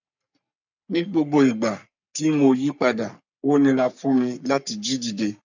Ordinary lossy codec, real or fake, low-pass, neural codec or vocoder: none; fake; 7.2 kHz; codec, 44.1 kHz, 3.4 kbps, Pupu-Codec